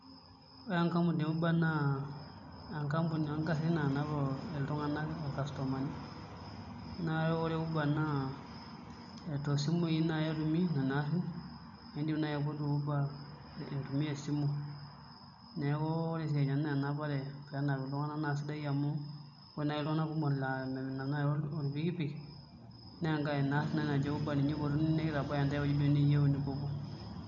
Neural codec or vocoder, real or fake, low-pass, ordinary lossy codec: none; real; 7.2 kHz; none